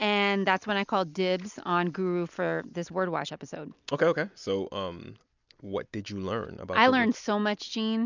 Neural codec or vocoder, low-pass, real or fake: none; 7.2 kHz; real